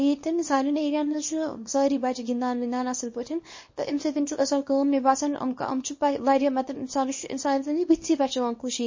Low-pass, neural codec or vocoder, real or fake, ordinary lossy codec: 7.2 kHz; codec, 24 kHz, 0.9 kbps, WavTokenizer, small release; fake; MP3, 32 kbps